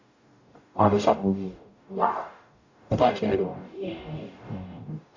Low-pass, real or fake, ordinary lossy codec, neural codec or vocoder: 7.2 kHz; fake; AAC, 48 kbps; codec, 44.1 kHz, 0.9 kbps, DAC